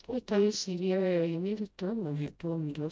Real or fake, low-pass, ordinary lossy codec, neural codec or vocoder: fake; none; none; codec, 16 kHz, 0.5 kbps, FreqCodec, smaller model